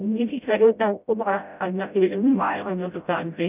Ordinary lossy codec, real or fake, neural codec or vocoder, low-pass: none; fake; codec, 16 kHz, 0.5 kbps, FreqCodec, smaller model; 3.6 kHz